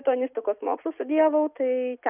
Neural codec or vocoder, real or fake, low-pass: none; real; 3.6 kHz